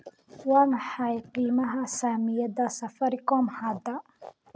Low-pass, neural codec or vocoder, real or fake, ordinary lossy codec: none; none; real; none